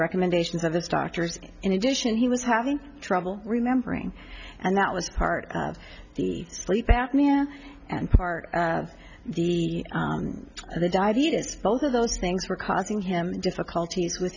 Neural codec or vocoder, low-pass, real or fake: none; 7.2 kHz; real